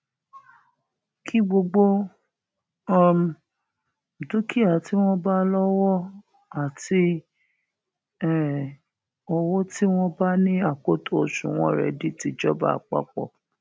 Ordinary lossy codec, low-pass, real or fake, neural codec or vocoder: none; none; real; none